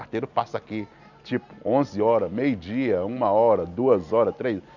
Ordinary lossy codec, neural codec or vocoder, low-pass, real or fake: none; none; 7.2 kHz; real